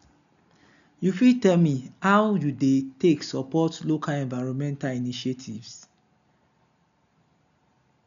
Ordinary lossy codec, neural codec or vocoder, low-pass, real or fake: none; none; 7.2 kHz; real